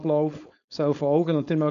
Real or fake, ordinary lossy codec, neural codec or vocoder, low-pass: fake; none; codec, 16 kHz, 4.8 kbps, FACodec; 7.2 kHz